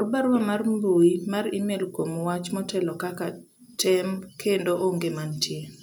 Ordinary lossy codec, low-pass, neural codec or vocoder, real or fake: none; none; none; real